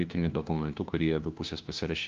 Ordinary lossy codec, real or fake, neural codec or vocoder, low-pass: Opus, 32 kbps; fake; codec, 16 kHz, 1 kbps, FunCodec, trained on LibriTTS, 50 frames a second; 7.2 kHz